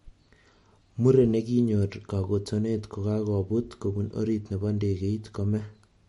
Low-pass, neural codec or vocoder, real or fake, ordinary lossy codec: 19.8 kHz; none; real; MP3, 48 kbps